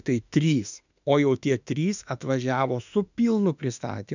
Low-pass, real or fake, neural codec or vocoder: 7.2 kHz; fake; autoencoder, 48 kHz, 32 numbers a frame, DAC-VAE, trained on Japanese speech